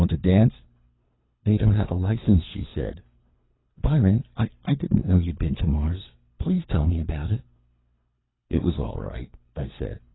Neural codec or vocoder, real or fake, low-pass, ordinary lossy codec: codec, 24 kHz, 3 kbps, HILCodec; fake; 7.2 kHz; AAC, 16 kbps